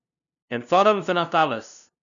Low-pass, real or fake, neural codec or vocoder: 7.2 kHz; fake; codec, 16 kHz, 0.5 kbps, FunCodec, trained on LibriTTS, 25 frames a second